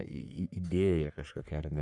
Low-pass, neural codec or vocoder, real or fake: 10.8 kHz; codec, 44.1 kHz, 3.4 kbps, Pupu-Codec; fake